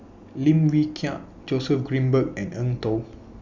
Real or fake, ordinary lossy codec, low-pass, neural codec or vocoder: real; MP3, 64 kbps; 7.2 kHz; none